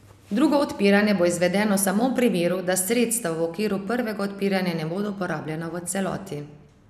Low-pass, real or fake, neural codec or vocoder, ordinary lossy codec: 14.4 kHz; real; none; none